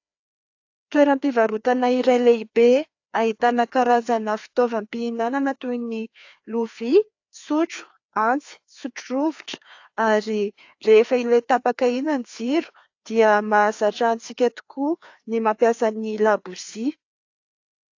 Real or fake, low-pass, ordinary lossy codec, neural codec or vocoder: fake; 7.2 kHz; AAC, 48 kbps; codec, 16 kHz, 2 kbps, FreqCodec, larger model